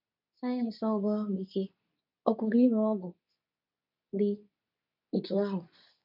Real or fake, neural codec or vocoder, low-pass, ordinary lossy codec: fake; codec, 24 kHz, 0.9 kbps, WavTokenizer, medium speech release version 2; 5.4 kHz; none